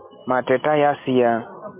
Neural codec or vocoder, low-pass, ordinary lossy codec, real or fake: none; 3.6 kHz; MP3, 24 kbps; real